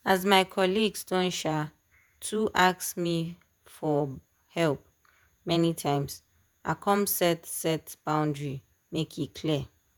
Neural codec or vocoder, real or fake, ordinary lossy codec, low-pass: vocoder, 48 kHz, 128 mel bands, Vocos; fake; none; none